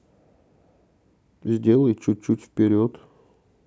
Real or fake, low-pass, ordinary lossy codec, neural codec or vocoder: real; none; none; none